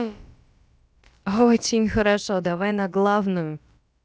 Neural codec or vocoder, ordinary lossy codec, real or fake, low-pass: codec, 16 kHz, about 1 kbps, DyCAST, with the encoder's durations; none; fake; none